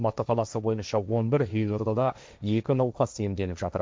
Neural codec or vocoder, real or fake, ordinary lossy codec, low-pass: codec, 16 kHz, 1.1 kbps, Voila-Tokenizer; fake; none; none